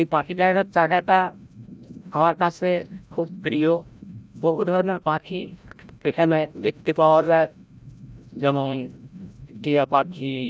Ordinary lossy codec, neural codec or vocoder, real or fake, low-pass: none; codec, 16 kHz, 0.5 kbps, FreqCodec, larger model; fake; none